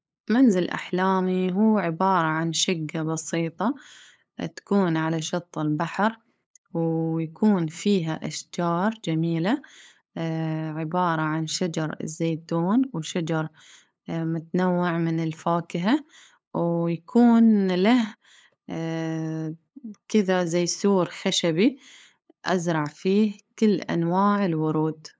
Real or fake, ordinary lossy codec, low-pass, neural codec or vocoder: fake; none; none; codec, 16 kHz, 8 kbps, FunCodec, trained on LibriTTS, 25 frames a second